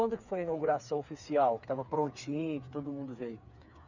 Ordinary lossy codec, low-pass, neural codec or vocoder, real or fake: none; 7.2 kHz; codec, 16 kHz, 4 kbps, FreqCodec, smaller model; fake